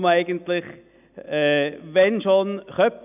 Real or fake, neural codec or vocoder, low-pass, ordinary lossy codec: real; none; 3.6 kHz; none